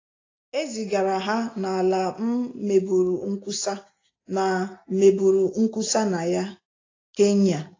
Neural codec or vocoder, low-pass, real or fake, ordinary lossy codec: none; 7.2 kHz; real; AAC, 32 kbps